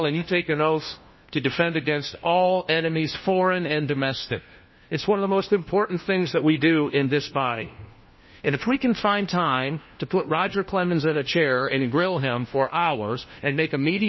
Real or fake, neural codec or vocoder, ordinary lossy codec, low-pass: fake; codec, 16 kHz, 1 kbps, FunCodec, trained on LibriTTS, 50 frames a second; MP3, 24 kbps; 7.2 kHz